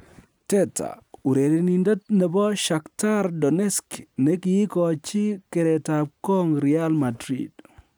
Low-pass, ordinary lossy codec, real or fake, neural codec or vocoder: none; none; real; none